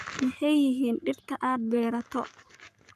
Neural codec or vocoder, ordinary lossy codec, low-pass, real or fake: codec, 44.1 kHz, 7.8 kbps, Pupu-Codec; none; 14.4 kHz; fake